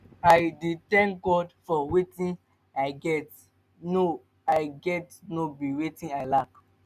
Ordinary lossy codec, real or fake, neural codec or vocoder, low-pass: none; real; none; 14.4 kHz